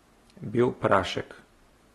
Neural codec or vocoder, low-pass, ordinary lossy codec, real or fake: none; 19.8 kHz; AAC, 32 kbps; real